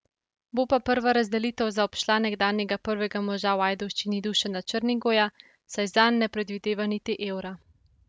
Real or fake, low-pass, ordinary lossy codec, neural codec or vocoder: real; none; none; none